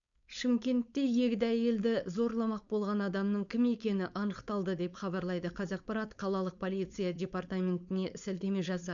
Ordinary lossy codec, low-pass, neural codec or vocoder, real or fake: none; 7.2 kHz; codec, 16 kHz, 4.8 kbps, FACodec; fake